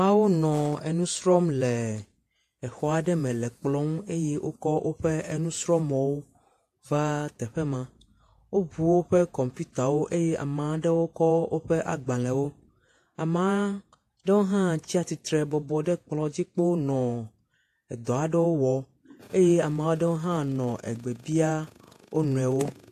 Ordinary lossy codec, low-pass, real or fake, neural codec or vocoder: AAC, 64 kbps; 14.4 kHz; fake; vocoder, 48 kHz, 128 mel bands, Vocos